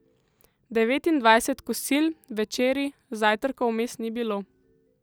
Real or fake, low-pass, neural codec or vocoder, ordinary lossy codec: real; none; none; none